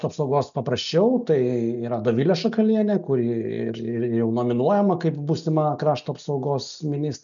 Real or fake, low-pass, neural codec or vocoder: real; 7.2 kHz; none